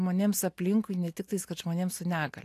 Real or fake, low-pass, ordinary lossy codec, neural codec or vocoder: real; 14.4 kHz; AAC, 64 kbps; none